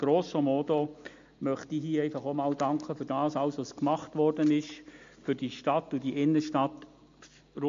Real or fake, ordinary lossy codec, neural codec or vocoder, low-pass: real; MP3, 48 kbps; none; 7.2 kHz